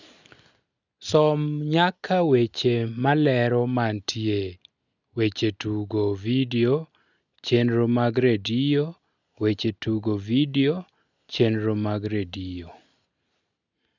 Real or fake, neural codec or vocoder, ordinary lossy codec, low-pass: real; none; none; 7.2 kHz